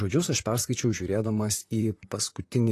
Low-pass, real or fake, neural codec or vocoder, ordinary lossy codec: 14.4 kHz; fake; vocoder, 44.1 kHz, 128 mel bands, Pupu-Vocoder; AAC, 48 kbps